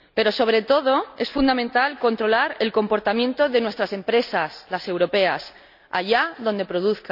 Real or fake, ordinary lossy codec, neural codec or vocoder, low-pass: real; none; none; 5.4 kHz